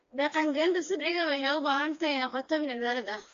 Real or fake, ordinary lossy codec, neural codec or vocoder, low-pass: fake; AAC, 64 kbps; codec, 16 kHz, 2 kbps, FreqCodec, smaller model; 7.2 kHz